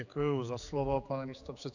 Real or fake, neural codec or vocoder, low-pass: fake; codec, 16 kHz, 4 kbps, X-Codec, HuBERT features, trained on general audio; 7.2 kHz